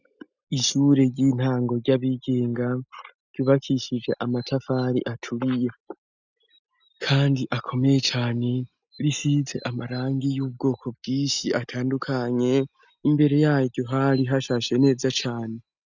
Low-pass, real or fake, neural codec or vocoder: 7.2 kHz; real; none